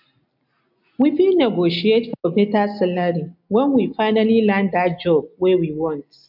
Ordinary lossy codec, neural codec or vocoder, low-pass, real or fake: MP3, 48 kbps; none; 5.4 kHz; real